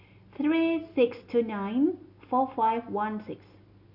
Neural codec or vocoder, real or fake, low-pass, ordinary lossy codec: none; real; 5.4 kHz; none